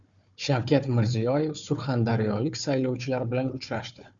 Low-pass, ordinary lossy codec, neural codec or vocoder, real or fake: 7.2 kHz; Opus, 64 kbps; codec, 16 kHz, 4 kbps, FunCodec, trained on Chinese and English, 50 frames a second; fake